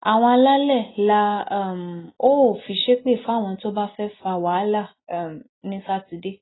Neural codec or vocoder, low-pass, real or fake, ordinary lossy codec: none; 7.2 kHz; real; AAC, 16 kbps